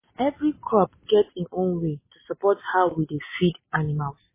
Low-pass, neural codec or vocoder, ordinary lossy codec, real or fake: 3.6 kHz; none; MP3, 16 kbps; real